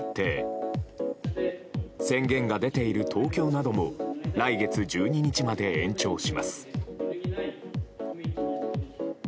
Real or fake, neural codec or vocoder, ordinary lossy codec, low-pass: real; none; none; none